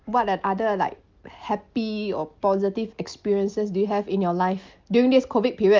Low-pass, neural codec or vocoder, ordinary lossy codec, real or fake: 7.2 kHz; none; Opus, 24 kbps; real